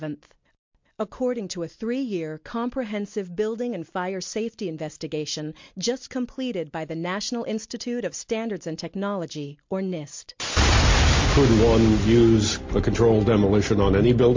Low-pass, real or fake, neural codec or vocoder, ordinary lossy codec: 7.2 kHz; real; none; MP3, 48 kbps